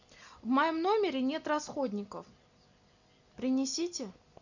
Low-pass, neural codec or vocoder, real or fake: 7.2 kHz; none; real